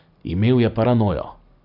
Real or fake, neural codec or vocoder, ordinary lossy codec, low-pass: real; none; none; 5.4 kHz